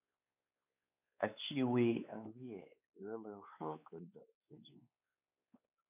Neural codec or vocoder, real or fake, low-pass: codec, 16 kHz, 4 kbps, X-Codec, WavLM features, trained on Multilingual LibriSpeech; fake; 3.6 kHz